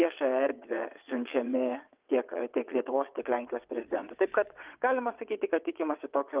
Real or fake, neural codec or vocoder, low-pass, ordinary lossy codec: fake; vocoder, 22.05 kHz, 80 mel bands, WaveNeXt; 3.6 kHz; Opus, 32 kbps